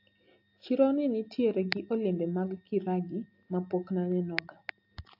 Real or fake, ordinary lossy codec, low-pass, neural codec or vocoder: real; AAC, 48 kbps; 5.4 kHz; none